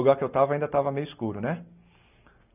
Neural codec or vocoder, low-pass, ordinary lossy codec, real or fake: none; 3.6 kHz; none; real